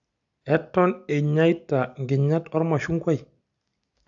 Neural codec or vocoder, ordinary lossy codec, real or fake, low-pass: none; AAC, 64 kbps; real; 7.2 kHz